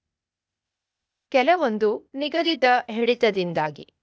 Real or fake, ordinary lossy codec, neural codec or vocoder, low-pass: fake; none; codec, 16 kHz, 0.8 kbps, ZipCodec; none